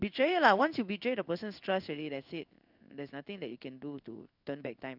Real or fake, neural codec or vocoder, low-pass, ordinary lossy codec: fake; codec, 16 kHz in and 24 kHz out, 1 kbps, XY-Tokenizer; 5.4 kHz; none